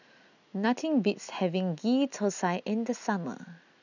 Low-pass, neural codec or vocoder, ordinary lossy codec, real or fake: 7.2 kHz; none; none; real